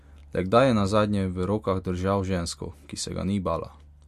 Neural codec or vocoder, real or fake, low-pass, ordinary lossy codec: none; real; 14.4 kHz; MP3, 64 kbps